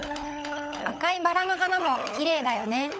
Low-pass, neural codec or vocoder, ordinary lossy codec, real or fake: none; codec, 16 kHz, 16 kbps, FunCodec, trained on LibriTTS, 50 frames a second; none; fake